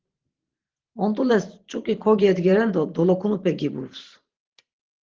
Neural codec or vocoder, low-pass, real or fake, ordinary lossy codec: vocoder, 44.1 kHz, 128 mel bands every 512 samples, BigVGAN v2; 7.2 kHz; fake; Opus, 16 kbps